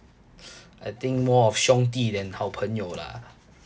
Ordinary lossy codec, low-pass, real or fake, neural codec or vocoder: none; none; real; none